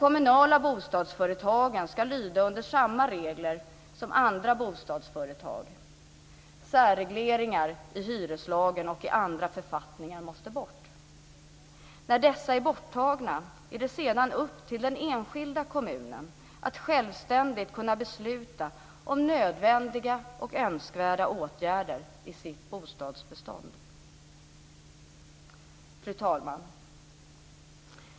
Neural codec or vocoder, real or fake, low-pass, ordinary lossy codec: none; real; none; none